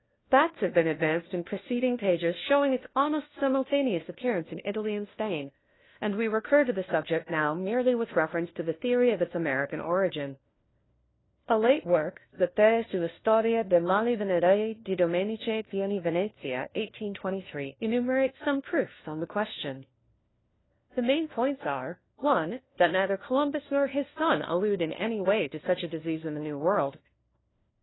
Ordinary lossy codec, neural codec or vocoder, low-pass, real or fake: AAC, 16 kbps; codec, 16 kHz, 1 kbps, FunCodec, trained on LibriTTS, 50 frames a second; 7.2 kHz; fake